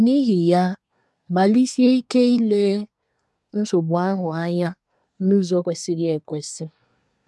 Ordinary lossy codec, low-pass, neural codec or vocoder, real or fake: none; none; codec, 24 kHz, 1 kbps, SNAC; fake